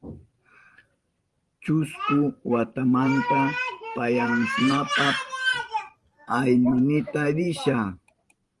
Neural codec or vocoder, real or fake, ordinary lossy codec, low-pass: vocoder, 44.1 kHz, 128 mel bands every 512 samples, BigVGAN v2; fake; Opus, 24 kbps; 10.8 kHz